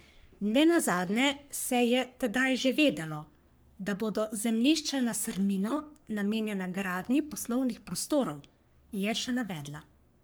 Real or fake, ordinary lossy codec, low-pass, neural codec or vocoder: fake; none; none; codec, 44.1 kHz, 3.4 kbps, Pupu-Codec